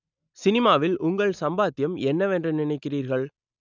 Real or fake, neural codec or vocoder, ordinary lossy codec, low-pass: real; none; none; 7.2 kHz